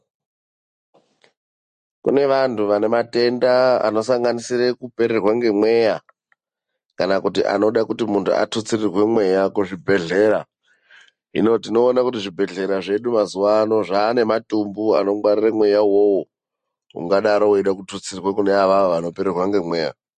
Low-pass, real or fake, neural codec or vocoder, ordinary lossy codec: 14.4 kHz; real; none; MP3, 48 kbps